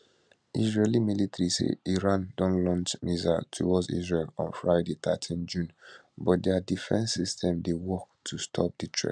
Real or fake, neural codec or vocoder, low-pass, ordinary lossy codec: real; none; 9.9 kHz; none